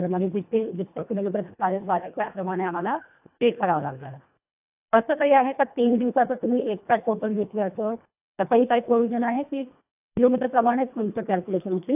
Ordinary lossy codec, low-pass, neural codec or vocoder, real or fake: AAC, 32 kbps; 3.6 kHz; codec, 24 kHz, 1.5 kbps, HILCodec; fake